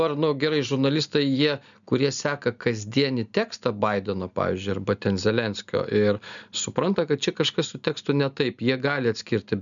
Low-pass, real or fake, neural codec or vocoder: 7.2 kHz; real; none